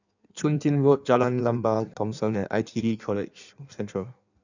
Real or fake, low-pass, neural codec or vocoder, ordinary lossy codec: fake; 7.2 kHz; codec, 16 kHz in and 24 kHz out, 1.1 kbps, FireRedTTS-2 codec; none